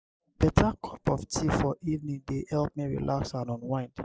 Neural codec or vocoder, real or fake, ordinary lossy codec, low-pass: none; real; none; none